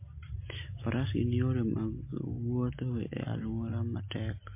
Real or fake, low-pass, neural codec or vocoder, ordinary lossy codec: real; 3.6 kHz; none; MP3, 24 kbps